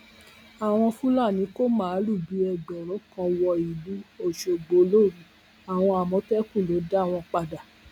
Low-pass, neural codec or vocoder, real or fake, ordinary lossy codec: 19.8 kHz; none; real; none